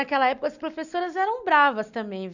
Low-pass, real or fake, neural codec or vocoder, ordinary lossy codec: 7.2 kHz; real; none; none